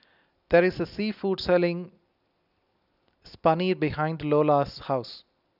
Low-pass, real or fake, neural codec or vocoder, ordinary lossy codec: 5.4 kHz; real; none; none